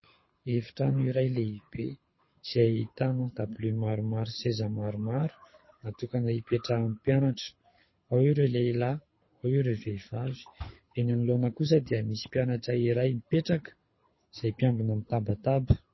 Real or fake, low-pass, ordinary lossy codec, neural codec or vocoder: fake; 7.2 kHz; MP3, 24 kbps; codec, 24 kHz, 6 kbps, HILCodec